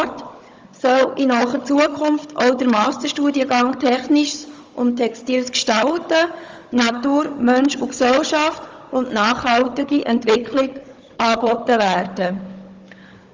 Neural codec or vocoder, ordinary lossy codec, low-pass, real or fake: codec, 16 kHz, 16 kbps, FunCodec, trained on Chinese and English, 50 frames a second; Opus, 32 kbps; 7.2 kHz; fake